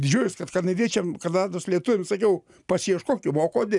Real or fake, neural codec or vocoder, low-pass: real; none; 10.8 kHz